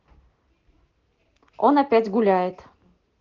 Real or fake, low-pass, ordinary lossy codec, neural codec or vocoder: real; 7.2 kHz; Opus, 16 kbps; none